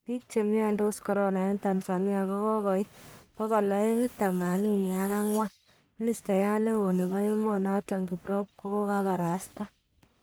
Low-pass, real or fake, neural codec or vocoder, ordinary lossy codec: none; fake; codec, 44.1 kHz, 1.7 kbps, Pupu-Codec; none